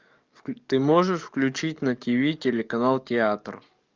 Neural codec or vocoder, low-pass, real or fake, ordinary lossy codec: codec, 44.1 kHz, 7.8 kbps, DAC; 7.2 kHz; fake; Opus, 24 kbps